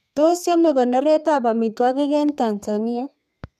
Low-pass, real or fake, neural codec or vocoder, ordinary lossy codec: 14.4 kHz; fake; codec, 32 kHz, 1.9 kbps, SNAC; none